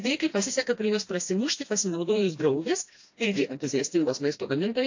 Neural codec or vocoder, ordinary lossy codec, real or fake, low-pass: codec, 16 kHz, 1 kbps, FreqCodec, smaller model; AAC, 48 kbps; fake; 7.2 kHz